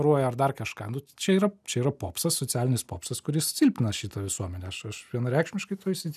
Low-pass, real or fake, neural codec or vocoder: 14.4 kHz; real; none